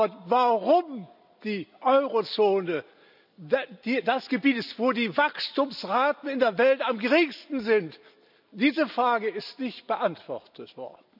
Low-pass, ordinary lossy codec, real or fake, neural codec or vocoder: 5.4 kHz; none; real; none